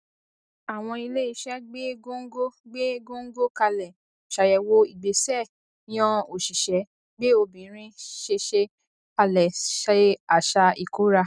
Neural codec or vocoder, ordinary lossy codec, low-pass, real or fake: none; none; 9.9 kHz; real